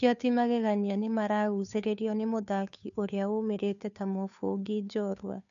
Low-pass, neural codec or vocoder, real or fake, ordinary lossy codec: 7.2 kHz; codec, 16 kHz, 2 kbps, FunCodec, trained on Chinese and English, 25 frames a second; fake; none